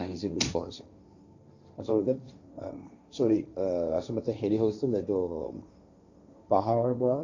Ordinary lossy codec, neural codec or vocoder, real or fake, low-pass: none; codec, 16 kHz, 1.1 kbps, Voila-Tokenizer; fake; 7.2 kHz